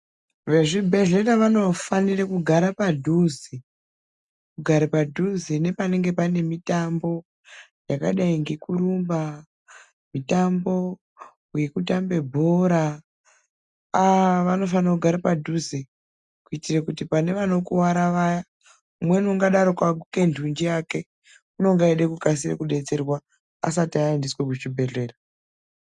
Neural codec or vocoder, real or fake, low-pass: none; real; 10.8 kHz